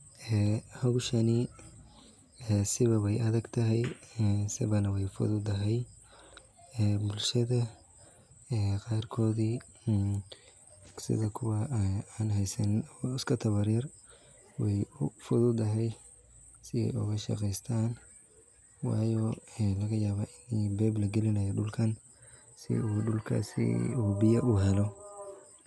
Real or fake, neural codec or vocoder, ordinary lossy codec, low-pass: real; none; none; none